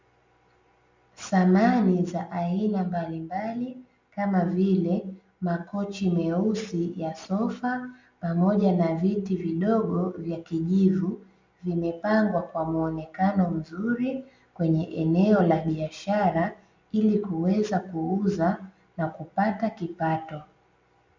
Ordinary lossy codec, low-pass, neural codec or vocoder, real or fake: MP3, 48 kbps; 7.2 kHz; none; real